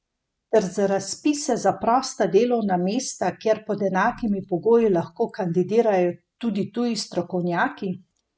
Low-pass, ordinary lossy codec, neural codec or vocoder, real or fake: none; none; none; real